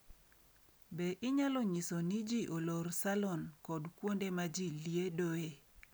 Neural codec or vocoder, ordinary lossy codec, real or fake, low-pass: none; none; real; none